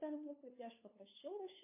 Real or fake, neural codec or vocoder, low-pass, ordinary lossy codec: fake; codec, 16 kHz, 2 kbps, FunCodec, trained on LibriTTS, 25 frames a second; 3.6 kHz; MP3, 24 kbps